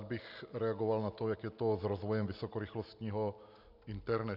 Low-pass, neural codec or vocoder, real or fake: 5.4 kHz; none; real